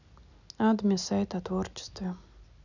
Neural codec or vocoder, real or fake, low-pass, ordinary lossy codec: none; real; 7.2 kHz; none